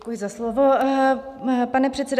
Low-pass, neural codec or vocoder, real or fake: 14.4 kHz; none; real